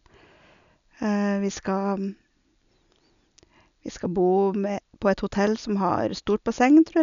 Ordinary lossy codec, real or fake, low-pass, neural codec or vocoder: none; real; 7.2 kHz; none